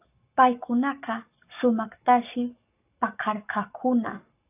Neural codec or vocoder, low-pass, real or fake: codec, 44.1 kHz, 7.8 kbps, Pupu-Codec; 3.6 kHz; fake